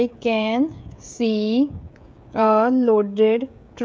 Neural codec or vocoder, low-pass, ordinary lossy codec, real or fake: codec, 16 kHz, 16 kbps, FreqCodec, smaller model; none; none; fake